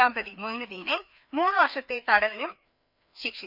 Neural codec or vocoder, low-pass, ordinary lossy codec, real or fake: codec, 16 kHz, 2 kbps, FreqCodec, larger model; 5.4 kHz; none; fake